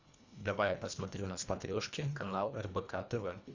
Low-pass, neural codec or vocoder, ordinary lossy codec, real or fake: 7.2 kHz; codec, 24 kHz, 1.5 kbps, HILCodec; Opus, 64 kbps; fake